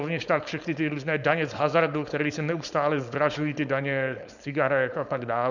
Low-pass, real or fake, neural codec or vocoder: 7.2 kHz; fake; codec, 16 kHz, 4.8 kbps, FACodec